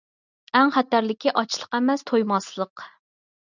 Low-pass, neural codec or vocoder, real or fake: 7.2 kHz; none; real